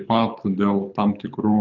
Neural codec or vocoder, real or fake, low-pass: codec, 24 kHz, 6 kbps, HILCodec; fake; 7.2 kHz